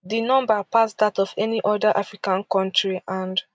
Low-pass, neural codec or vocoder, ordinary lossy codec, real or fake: none; none; none; real